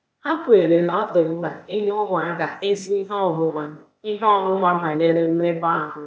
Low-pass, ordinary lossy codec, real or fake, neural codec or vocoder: none; none; fake; codec, 16 kHz, 0.8 kbps, ZipCodec